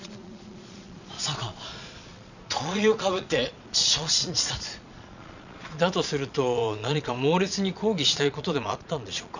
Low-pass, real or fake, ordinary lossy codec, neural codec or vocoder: 7.2 kHz; fake; none; vocoder, 22.05 kHz, 80 mel bands, Vocos